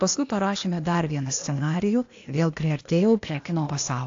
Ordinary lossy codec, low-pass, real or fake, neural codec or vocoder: AAC, 48 kbps; 7.2 kHz; fake; codec, 16 kHz, 0.8 kbps, ZipCodec